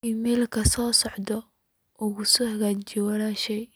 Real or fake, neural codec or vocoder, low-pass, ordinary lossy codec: real; none; none; none